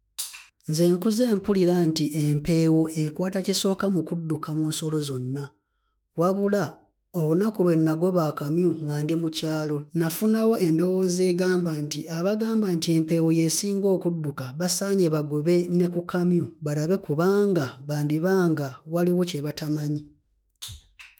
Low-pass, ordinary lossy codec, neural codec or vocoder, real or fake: none; none; autoencoder, 48 kHz, 32 numbers a frame, DAC-VAE, trained on Japanese speech; fake